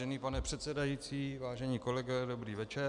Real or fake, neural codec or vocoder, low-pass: real; none; 10.8 kHz